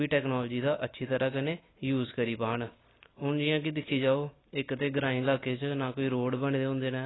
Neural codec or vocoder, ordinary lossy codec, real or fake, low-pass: none; AAC, 16 kbps; real; 7.2 kHz